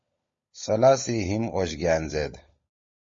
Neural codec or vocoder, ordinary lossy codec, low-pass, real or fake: codec, 16 kHz, 16 kbps, FunCodec, trained on LibriTTS, 50 frames a second; MP3, 32 kbps; 7.2 kHz; fake